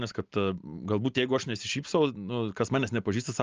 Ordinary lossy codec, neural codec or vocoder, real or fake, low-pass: Opus, 24 kbps; none; real; 7.2 kHz